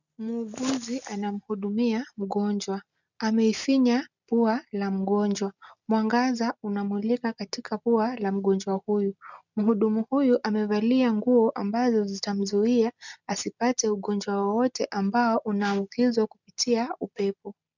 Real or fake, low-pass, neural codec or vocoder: real; 7.2 kHz; none